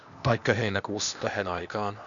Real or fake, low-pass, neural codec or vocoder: fake; 7.2 kHz; codec, 16 kHz, 0.8 kbps, ZipCodec